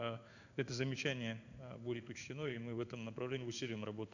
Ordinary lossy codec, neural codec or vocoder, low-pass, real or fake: none; codec, 16 kHz in and 24 kHz out, 1 kbps, XY-Tokenizer; 7.2 kHz; fake